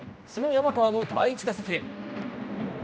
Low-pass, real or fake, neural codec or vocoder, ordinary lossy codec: none; fake; codec, 16 kHz, 1 kbps, X-Codec, HuBERT features, trained on general audio; none